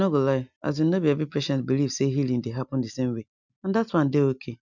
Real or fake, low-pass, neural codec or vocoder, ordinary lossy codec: real; 7.2 kHz; none; none